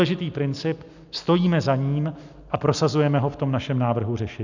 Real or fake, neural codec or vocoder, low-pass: real; none; 7.2 kHz